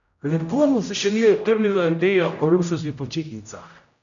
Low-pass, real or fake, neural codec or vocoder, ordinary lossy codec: 7.2 kHz; fake; codec, 16 kHz, 0.5 kbps, X-Codec, HuBERT features, trained on general audio; none